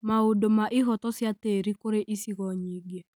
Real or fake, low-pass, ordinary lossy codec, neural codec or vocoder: real; none; none; none